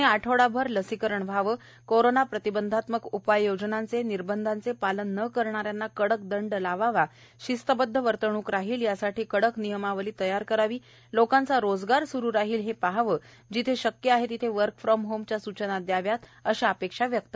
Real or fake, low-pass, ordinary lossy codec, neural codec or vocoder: real; none; none; none